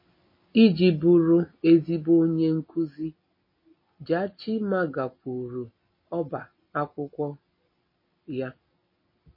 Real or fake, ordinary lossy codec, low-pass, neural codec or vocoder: real; MP3, 24 kbps; 5.4 kHz; none